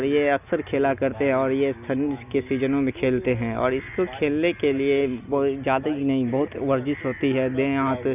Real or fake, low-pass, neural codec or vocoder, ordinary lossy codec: real; 3.6 kHz; none; none